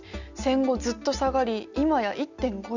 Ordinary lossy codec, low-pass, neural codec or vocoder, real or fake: none; 7.2 kHz; none; real